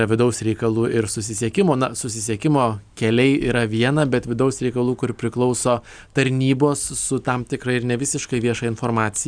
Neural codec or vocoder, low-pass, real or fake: none; 9.9 kHz; real